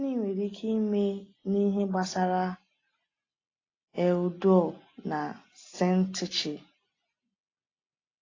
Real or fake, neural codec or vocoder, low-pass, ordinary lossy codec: real; none; 7.2 kHz; AAC, 32 kbps